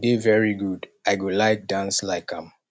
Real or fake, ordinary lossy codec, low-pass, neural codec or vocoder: real; none; none; none